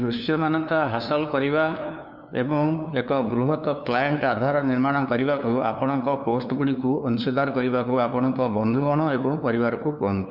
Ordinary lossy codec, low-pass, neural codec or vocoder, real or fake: none; 5.4 kHz; codec, 16 kHz, 2 kbps, FunCodec, trained on LibriTTS, 25 frames a second; fake